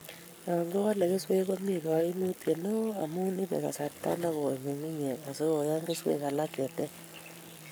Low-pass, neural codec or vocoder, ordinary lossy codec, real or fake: none; codec, 44.1 kHz, 7.8 kbps, Pupu-Codec; none; fake